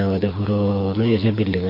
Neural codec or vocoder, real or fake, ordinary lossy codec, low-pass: codec, 24 kHz, 6 kbps, HILCodec; fake; MP3, 32 kbps; 5.4 kHz